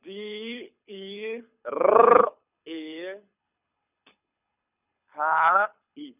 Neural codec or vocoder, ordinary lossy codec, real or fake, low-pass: codec, 24 kHz, 6 kbps, HILCodec; none; fake; 3.6 kHz